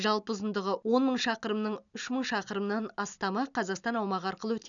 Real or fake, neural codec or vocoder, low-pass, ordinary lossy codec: real; none; 7.2 kHz; none